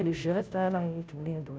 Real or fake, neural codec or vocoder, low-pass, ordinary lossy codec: fake; codec, 16 kHz, 0.5 kbps, FunCodec, trained on Chinese and English, 25 frames a second; none; none